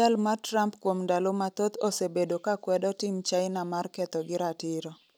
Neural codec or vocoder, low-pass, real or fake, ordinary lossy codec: none; none; real; none